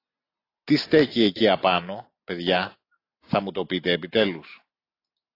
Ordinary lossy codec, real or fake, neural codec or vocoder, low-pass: AAC, 32 kbps; real; none; 5.4 kHz